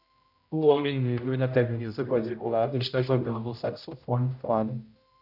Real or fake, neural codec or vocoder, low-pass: fake; codec, 16 kHz, 0.5 kbps, X-Codec, HuBERT features, trained on general audio; 5.4 kHz